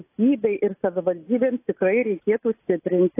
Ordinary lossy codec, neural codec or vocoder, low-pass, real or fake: AAC, 24 kbps; none; 3.6 kHz; real